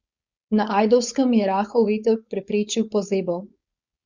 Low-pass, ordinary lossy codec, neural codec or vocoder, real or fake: 7.2 kHz; Opus, 64 kbps; codec, 16 kHz, 4.8 kbps, FACodec; fake